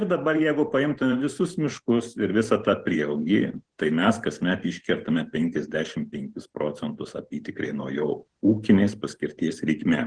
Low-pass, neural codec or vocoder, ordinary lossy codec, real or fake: 9.9 kHz; vocoder, 44.1 kHz, 128 mel bands every 512 samples, BigVGAN v2; Opus, 16 kbps; fake